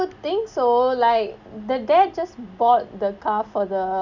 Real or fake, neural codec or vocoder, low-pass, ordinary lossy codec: real; none; 7.2 kHz; none